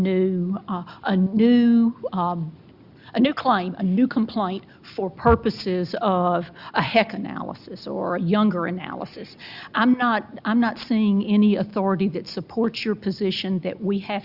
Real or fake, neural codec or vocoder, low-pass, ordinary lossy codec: real; none; 5.4 kHz; Opus, 64 kbps